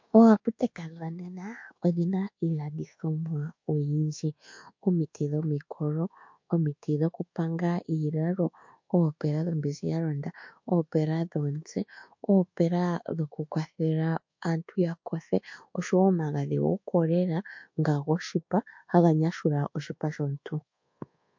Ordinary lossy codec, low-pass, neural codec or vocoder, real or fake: MP3, 48 kbps; 7.2 kHz; codec, 24 kHz, 1.2 kbps, DualCodec; fake